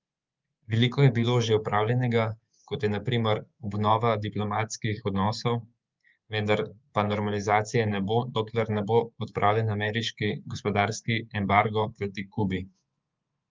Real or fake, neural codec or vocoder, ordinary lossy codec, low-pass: fake; codec, 24 kHz, 3.1 kbps, DualCodec; Opus, 24 kbps; 7.2 kHz